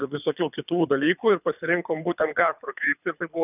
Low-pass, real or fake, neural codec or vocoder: 3.6 kHz; fake; codec, 24 kHz, 3 kbps, HILCodec